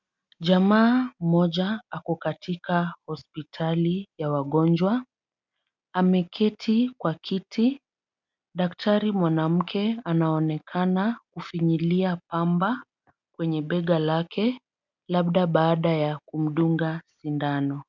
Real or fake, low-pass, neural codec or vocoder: real; 7.2 kHz; none